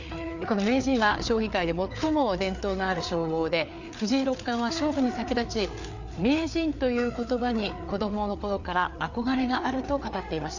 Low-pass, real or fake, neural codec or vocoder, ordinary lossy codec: 7.2 kHz; fake; codec, 16 kHz, 4 kbps, FreqCodec, larger model; none